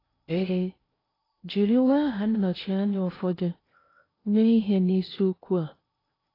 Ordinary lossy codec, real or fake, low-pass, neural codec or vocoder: AAC, 24 kbps; fake; 5.4 kHz; codec, 16 kHz in and 24 kHz out, 0.8 kbps, FocalCodec, streaming, 65536 codes